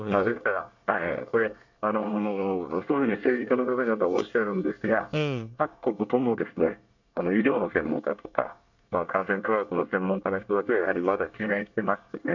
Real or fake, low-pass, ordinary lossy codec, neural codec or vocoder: fake; 7.2 kHz; none; codec, 24 kHz, 1 kbps, SNAC